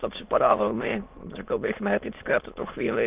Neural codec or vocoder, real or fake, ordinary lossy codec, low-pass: autoencoder, 22.05 kHz, a latent of 192 numbers a frame, VITS, trained on many speakers; fake; Opus, 24 kbps; 3.6 kHz